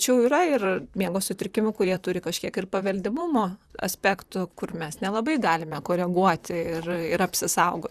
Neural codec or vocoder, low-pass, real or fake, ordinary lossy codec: vocoder, 44.1 kHz, 128 mel bands, Pupu-Vocoder; 14.4 kHz; fake; Opus, 64 kbps